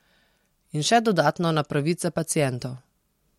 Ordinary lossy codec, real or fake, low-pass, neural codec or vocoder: MP3, 64 kbps; real; 19.8 kHz; none